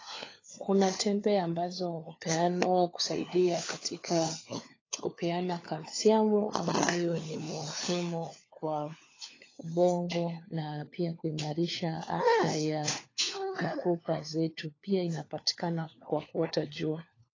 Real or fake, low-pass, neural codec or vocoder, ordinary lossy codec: fake; 7.2 kHz; codec, 16 kHz, 4 kbps, FunCodec, trained on LibriTTS, 50 frames a second; AAC, 32 kbps